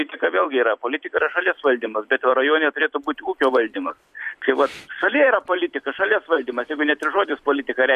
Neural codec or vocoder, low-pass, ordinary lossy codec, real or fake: vocoder, 44.1 kHz, 128 mel bands every 256 samples, BigVGAN v2; 14.4 kHz; MP3, 64 kbps; fake